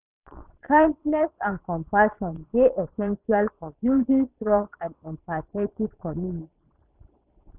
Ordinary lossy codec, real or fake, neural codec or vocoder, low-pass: none; fake; vocoder, 22.05 kHz, 80 mel bands, Vocos; 3.6 kHz